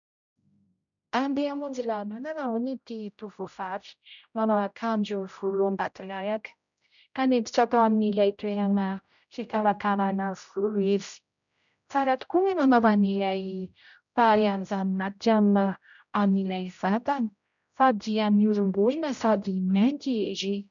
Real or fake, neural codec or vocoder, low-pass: fake; codec, 16 kHz, 0.5 kbps, X-Codec, HuBERT features, trained on general audio; 7.2 kHz